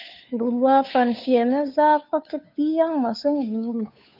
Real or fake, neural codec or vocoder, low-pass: fake; codec, 16 kHz, 2 kbps, FunCodec, trained on Chinese and English, 25 frames a second; 5.4 kHz